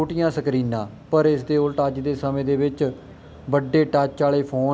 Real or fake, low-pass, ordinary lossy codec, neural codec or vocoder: real; none; none; none